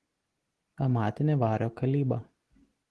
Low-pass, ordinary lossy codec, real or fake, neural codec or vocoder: 10.8 kHz; Opus, 16 kbps; real; none